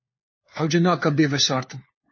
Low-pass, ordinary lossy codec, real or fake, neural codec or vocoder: 7.2 kHz; MP3, 32 kbps; fake; codec, 16 kHz, 4 kbps, FunCodec, trained on LibriTTS, 50 frames a second